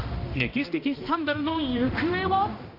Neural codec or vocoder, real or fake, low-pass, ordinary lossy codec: codec, 16 kHz, 1 kbps, X-Codec, HuBERT features, trained on balanced general audio; fake; 5.4 kHz; AAC, 32 kbps